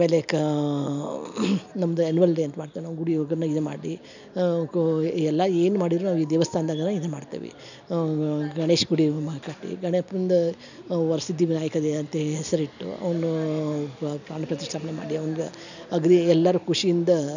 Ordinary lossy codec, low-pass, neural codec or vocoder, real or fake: none; 7.2 kHz; none; real